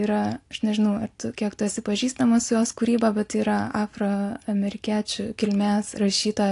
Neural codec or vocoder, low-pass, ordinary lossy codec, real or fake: none; 10.8 kHz; AAC, 48 kbps; real